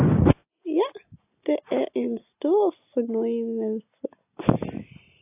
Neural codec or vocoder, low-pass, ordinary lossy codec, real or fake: none; 3.6 kHz; AAC, 24 kbps; real